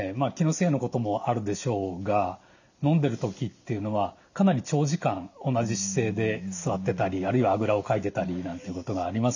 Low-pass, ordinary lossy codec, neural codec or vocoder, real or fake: 7.2 kHz; none; none; real